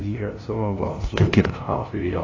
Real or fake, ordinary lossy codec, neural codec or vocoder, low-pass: fake; AAC, 32 kbps; codec, 16 kHz, 0.5 kbps, FunCodec, trained on LibriTTS, 25 frames a second; 7.2 kHz